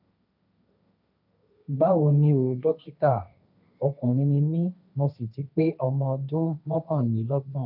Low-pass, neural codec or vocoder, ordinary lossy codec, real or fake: 5.4 kHz; codec, 16 kHz, 1.1 kbps, Voila-Tokenizer; none; fake